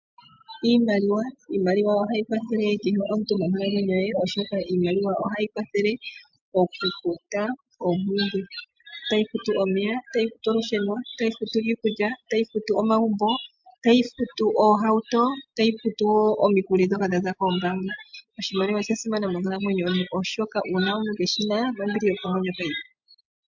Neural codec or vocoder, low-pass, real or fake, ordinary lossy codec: none; 7.2 kHz; real; MP3, 64 kbps